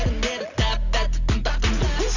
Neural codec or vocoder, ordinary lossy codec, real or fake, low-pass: none; none; real; 7.2 kHz